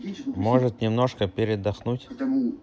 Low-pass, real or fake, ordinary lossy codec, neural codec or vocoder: none; real; none; none